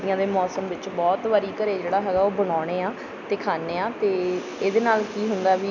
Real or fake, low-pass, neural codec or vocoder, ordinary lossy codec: real; 7.2 kHz; none; none